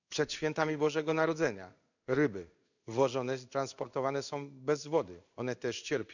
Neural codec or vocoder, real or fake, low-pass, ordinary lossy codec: codec, 16 kHz in and 24 kHz out, 1 kbps, XY-Tokenizer; fake; 7.2 kHz; none